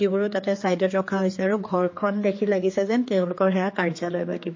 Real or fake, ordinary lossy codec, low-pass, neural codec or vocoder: fake; MP3, 32 kbps; 7.2 kHz; codec, 16 kHz, 4 kbps, X-Codec, HuBERT features, trained on general audio